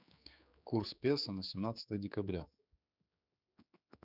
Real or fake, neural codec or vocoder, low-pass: fake; codec, 16 kHz, 4 kbps, X-Codec, HuBERT features, trained on general audio; 5.4 kHz